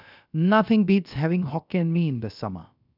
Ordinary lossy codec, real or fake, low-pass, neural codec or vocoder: none; fake; 5.4 kHz; codec, 16 kHz, about 1 kbps, DyCAST, with the encoder's durations